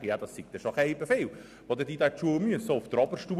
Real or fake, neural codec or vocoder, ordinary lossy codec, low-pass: real; none; none; 14.4 kHz